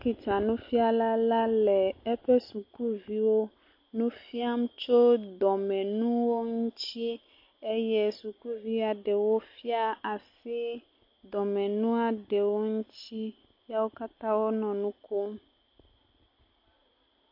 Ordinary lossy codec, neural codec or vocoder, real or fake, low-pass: MP3, 32 kbps; none; real; 5.4 kHz